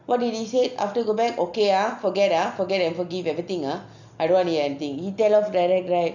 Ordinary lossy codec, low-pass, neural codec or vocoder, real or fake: none; 7.2 kHz; none; real